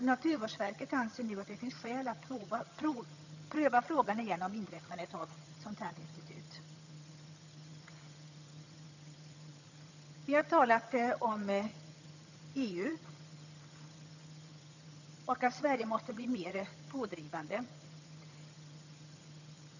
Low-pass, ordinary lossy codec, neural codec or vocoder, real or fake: 7.2 kHz; none; vocoder, 22.05 kHz, 80 mel bands, HiFi-GAN; fake